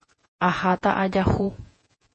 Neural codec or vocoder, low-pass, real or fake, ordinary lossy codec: vocoder, 48 kHz, 128 mel bands, Vocos; 10.8 kHz; fake; MP3, 32 kbps